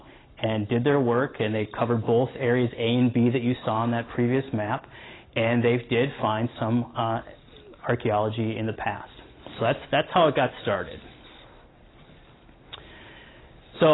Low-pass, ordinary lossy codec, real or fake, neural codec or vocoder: 7.2 kHz; AAC, 16 kbps; real; none